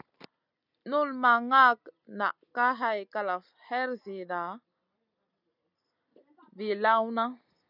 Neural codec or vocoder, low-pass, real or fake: none; 5.4 kHz; real